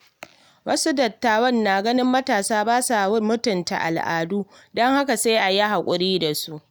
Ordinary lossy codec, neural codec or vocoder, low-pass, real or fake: none; none; none; real